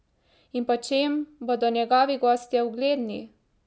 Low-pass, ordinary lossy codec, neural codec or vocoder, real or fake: none; none; none; real